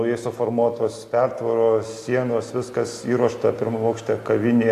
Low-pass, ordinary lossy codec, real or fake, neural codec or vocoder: 14.4 kHz; AAC, 64 kbps; real; none